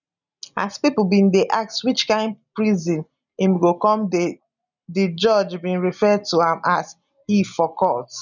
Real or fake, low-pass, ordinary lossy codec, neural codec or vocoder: real; 7.2 kHz; none; none